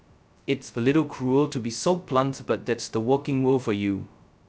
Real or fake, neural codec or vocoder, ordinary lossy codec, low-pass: fake; codec, 16 kHz, 0.2 kbps, FocalCodec; none; none